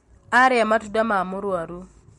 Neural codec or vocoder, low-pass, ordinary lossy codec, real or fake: none; 19.8 kHz; MP3, 48 kbps; real